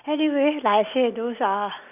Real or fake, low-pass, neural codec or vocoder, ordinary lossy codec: real; 3.6 kHz; none; none